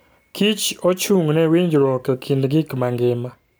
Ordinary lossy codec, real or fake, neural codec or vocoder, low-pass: none; real; none; none